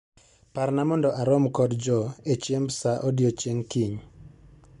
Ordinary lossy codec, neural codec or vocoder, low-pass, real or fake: MP3, 64 kbps; none; 10.8 kHz; real